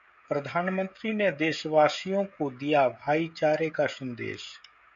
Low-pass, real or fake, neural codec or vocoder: 7.2 kHz; fake; codec, 16 kHz, 16 kbps, FreqCodec, smaller model